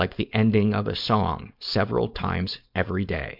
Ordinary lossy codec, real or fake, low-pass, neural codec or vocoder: MP3, 48 kbps; fake; 5.4 kHz; codec, 16 kHz, 4.8 kbps, FACodec